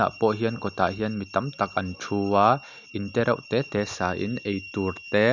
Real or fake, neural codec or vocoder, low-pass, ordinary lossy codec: real; none; 7.2 kHz; none